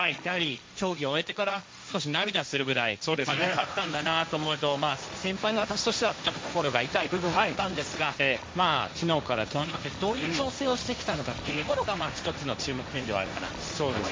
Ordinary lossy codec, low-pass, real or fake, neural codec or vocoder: none; none; fake; codec, 16 kHz, 1.1 kbps, Voila-Tokenizer